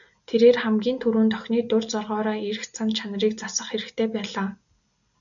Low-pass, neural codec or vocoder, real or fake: 7.2 kHz; none; real